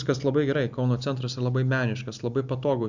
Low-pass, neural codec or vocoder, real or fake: 7.2 kHz; none; real